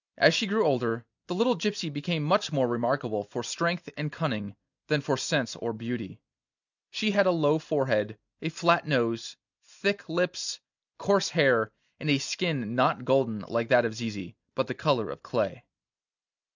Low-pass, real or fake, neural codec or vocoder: 7.2 kHz; real; none